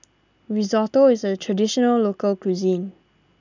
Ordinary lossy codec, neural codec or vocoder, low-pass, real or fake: none; none; 7.2 kHz; real